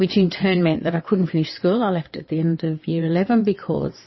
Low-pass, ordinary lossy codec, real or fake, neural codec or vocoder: 7.2 kHz; MP3, 24 kbps; fake; vocoder, 22.05 kHz, 80 mel bands, WaveNeXt